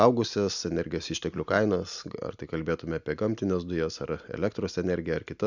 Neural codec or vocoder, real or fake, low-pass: none; real; 7.2 kHz